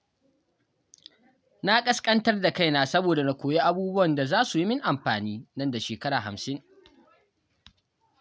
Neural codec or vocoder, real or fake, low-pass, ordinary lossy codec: none; real; none; none